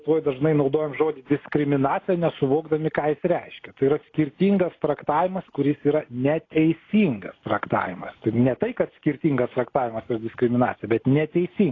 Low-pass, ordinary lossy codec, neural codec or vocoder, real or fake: 7.2 kHz; AAC, 32 kbps; none; real